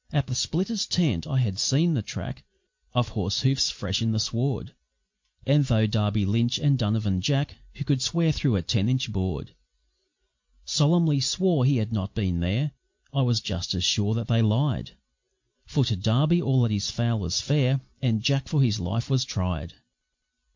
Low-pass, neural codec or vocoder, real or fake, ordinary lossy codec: 7.2 kHz; none; real; MP3, 48 kbps